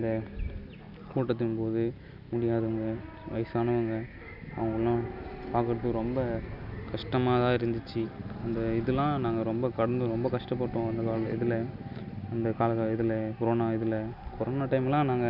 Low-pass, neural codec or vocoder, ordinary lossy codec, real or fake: 5.4 kHz; none; none; real